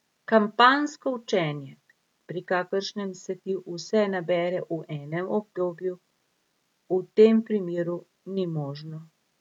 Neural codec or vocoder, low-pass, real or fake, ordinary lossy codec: none; 19.8 kHz; real; none